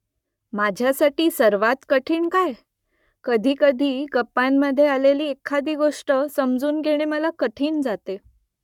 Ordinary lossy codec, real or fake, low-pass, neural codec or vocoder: none; fake; 19.8 kHz; codec, 44.1 kHz, 7.8 kbps, Pupu-Codec